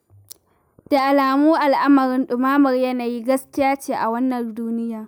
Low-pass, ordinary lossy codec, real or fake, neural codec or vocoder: none; none; real; none